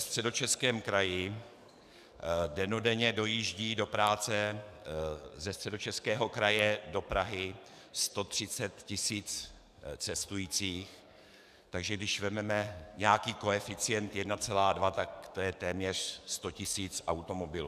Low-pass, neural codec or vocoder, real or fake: 14.4 kHz; codec, 44.1 kHz, 7.8 kbps, DAC; fake